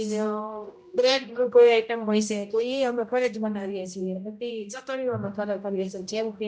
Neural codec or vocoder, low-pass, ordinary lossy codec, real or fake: codec, 16 kHz, 0.5 kbps, X-Codec, HuBERT features, trained on general audio; none; none; fake